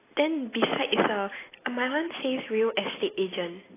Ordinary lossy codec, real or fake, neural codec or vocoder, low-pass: AAC, 16 kbps; real; none; 3.6 kHz